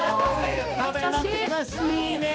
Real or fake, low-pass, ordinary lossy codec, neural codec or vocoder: fake; none; none; codec, 16 kHz, 2 kbps, X-Codec, HuBERT features, trained on balanced general audio